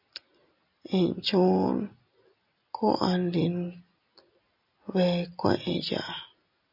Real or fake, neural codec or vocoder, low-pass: real; none; 5.4 kHz